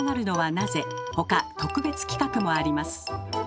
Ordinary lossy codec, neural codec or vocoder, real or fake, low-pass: none; none; real; none